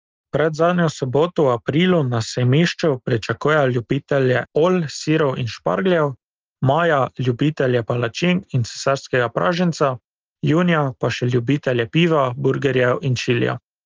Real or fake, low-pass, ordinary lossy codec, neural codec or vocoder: real; 7.2 kHz; Opus, 24 kbps; none